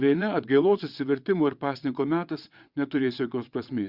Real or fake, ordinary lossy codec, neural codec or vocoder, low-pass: fake; Opus, 64 kbps; vocoder, 22.05 kHz, 80 mel bands, WaveNeXt; 5.4 kHz